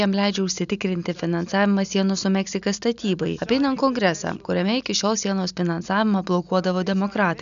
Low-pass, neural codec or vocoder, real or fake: 7.2 kHz; none; real